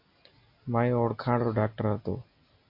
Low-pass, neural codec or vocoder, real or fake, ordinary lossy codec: 5.4 kHz; none; real; MP3, 32 kbps